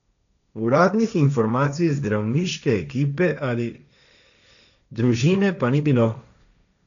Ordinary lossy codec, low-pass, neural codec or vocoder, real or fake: none; 7.2 kHz; codec, 16 kHz, 1.1 kbps, Voila-Tokenizer; fake